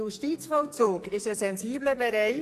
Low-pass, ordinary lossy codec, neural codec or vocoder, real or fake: 14.4 kHz; AAC, 64 kbps; codec, 44.1 kHz, 2.6 kbps, SNAC; fake